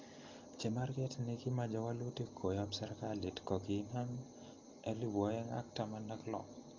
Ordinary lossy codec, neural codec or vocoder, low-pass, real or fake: Opus, 24 kbps; none; 7.2 kHz; real